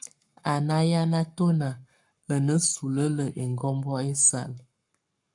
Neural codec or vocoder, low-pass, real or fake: codec, 44.1 kHz, 7.8 kbps, Pupu-Codec; 10.8 kHz; fake